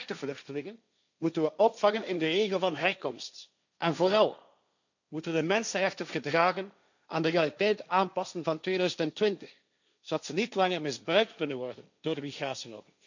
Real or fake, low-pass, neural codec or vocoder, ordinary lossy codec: fake; 7.2 kHz; codec, 16 kHz, 1.1 kbps, Voila-Tokenizer; none